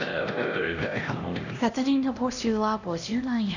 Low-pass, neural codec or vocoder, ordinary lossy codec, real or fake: 7.2 kHz; codec, 16 kHz, 1 kbps, X-Codec, HuBERT features, trained on LibriSpeech; none; fake